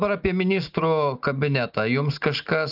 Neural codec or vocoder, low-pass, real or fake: none; 5.4 kHz; real